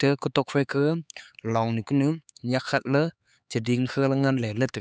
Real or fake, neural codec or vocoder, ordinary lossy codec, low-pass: fake; codec, 16 kHz, 4 kbps, X-Codec, HuBERT features, trained on LibriSpeech; none; none